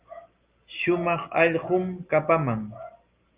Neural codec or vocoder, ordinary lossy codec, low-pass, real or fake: none; Opus, 32 kbps; 3.6 kHz; real